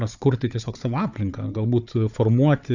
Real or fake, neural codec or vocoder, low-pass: fake; codec, 16 kHz, 4 kbps, FunCodec, trained on Chinese and English, 50 frames a second; 7.2 kHz